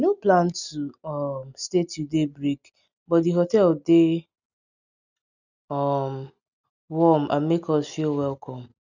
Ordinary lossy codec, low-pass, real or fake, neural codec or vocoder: none; 7.2 kHz; real; none